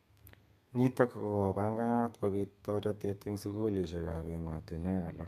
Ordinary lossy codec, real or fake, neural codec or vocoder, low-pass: none; fake; codec, 32 kHz, 1.9 kbps, SNAC; 14.4 kHz